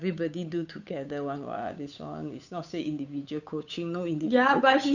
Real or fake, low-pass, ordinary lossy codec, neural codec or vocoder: fake; 7.2 kHz; none; codec, 16 kHz, 8 kbps, FunCodec, trained on Chinese and English, 25 frames a second